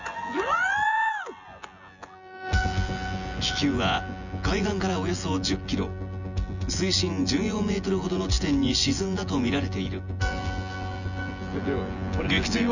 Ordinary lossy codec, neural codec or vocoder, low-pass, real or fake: none; vocoder, 24 kHz, 100 mel bands, Vocos; 7.2 kHz; fake